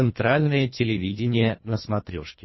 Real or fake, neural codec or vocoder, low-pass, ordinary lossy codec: fake; codec, 24 kHz, 1.5 kbps, HILCodec; 7.2 kHz; MP3, 24 kbps